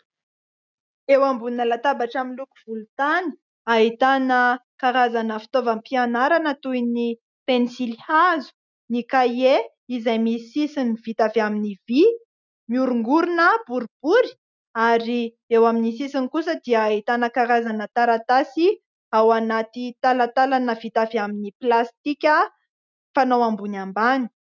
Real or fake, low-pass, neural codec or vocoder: real; 7.2 kHz; none